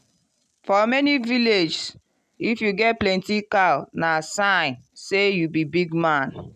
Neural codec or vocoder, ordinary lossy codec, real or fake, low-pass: none; none; real; 14.4 kHz